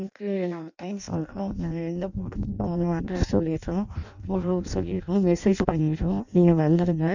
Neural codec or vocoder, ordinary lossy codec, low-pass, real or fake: codec, 16 kHz in and 24 kHz out, 0.6 kbps, FireRedTTS-2 codec; none; 7.2 kHz; fake